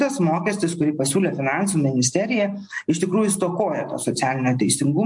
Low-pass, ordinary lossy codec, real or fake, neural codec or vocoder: 10.8 kHz; MP3, 64 kbps; real; none